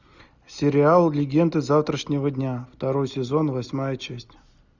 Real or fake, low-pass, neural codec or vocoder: real; 7.2 kHz; none